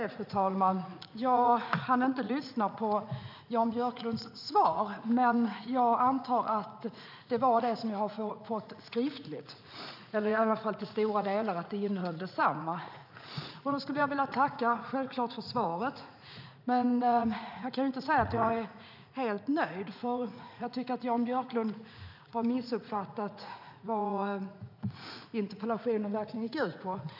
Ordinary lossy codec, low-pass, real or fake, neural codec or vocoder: none; 5.4 kHz; fake; vocoder, 44.1 kHz, 80 mel bands, Vocos